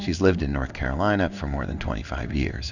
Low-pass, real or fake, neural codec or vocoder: 7.2 kHz; fake; codec, 16 kHz in and 24 kHz out, 1 kbps, XY-Tokenizer